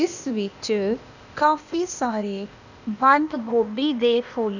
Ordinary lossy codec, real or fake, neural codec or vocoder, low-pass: none; fake; codec, 16 kHz, 0.8 kbps, ZipCodec; 7.2 kHz